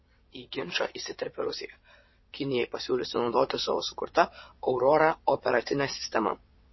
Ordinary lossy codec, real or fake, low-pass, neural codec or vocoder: MP3, 24 kbps; fake; 7.2 kHz; vocoder, 22.05 kHz, 80 mel bands, WaveNeXt